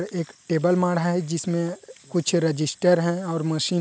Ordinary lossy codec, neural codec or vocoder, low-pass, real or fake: none; none; none; real